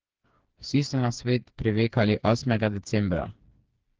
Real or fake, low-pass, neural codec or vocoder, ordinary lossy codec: fake; 7.2 kHz; codec, 16 kHz, 4 kbps, FreqCodec, smaller model; Opus, 16 kbps